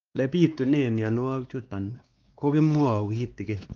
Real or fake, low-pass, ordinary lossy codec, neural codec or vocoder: fake; 7.2 kHz; Opus, 32 kbps; codec, 16 kHz, 2 kbps, X-Codec, WavLM features, trained on Multilingual LibriSpeech